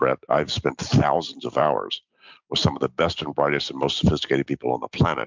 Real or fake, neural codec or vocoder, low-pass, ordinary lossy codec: real; none; 7.2 kHz; MP3, 64 kbps